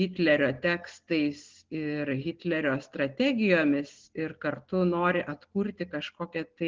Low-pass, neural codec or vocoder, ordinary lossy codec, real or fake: 7.2 kHz; none; Opus, 16 kbps; real